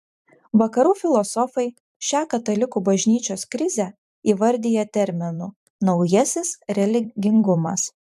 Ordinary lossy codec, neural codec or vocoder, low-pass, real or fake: AAC, 96 kbps; vocoder, 44.1 kHz, 128 mel bands every 256 samples, BigVGAN v2; 14.4 kHz; fake